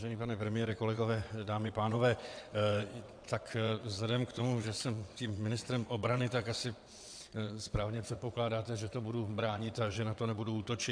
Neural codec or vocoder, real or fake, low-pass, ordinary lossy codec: vocoder, 22.05 kHz, 80 mel bands, WaveNeXt; fake; 9.9 kHz; AAC, 64 kbps